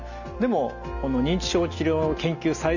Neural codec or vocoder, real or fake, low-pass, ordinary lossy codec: none; real; 7.2 kHz; none